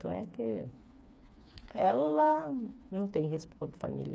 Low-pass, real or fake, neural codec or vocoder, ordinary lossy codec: none; fake; codec, 16 kHz, 4 kbps, FreqCodec, smaller model; none